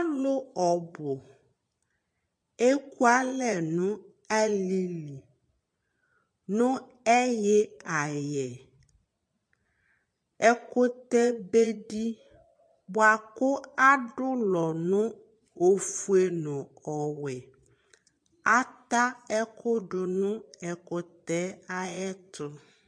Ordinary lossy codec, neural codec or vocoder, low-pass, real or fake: MP3, 48 kbps; vocoder, 22.05 kHz, 80 mel bands, Vocos; 9.9 kHz; fake